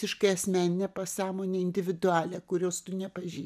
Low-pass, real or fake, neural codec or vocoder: 14.4 kHz; real; none